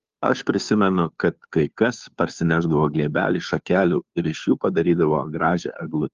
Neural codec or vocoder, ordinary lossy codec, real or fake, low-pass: codec, 16 kHz, 2 kbps, FunCodec, trained on Chinese and English, 25 frames a second; Opus, 32 kbps; fake; 7.2 kHz